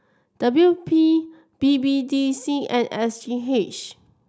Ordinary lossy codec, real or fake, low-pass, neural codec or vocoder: none; real; none; none